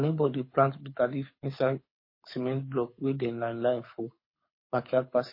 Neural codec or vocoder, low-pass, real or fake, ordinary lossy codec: codec, 24 kHz, 6 kbps, HILCodec; 5.4 kHz; fake; MP3, 24 kbps